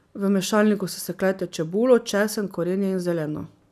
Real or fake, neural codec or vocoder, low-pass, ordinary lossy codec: real; none; 14.4 kHz; none